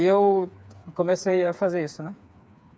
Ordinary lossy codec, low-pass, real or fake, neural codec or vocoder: none; none; fake; codec, 16 kHz, 4 kbps, FreqCodec, smaller model